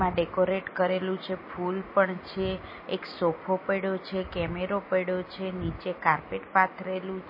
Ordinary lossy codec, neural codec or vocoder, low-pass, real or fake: MP3, 24 kbps; none; 5.4 kHz; real